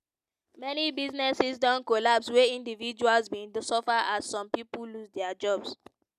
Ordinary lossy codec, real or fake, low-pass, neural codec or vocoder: none; real; 14.4 kHz; none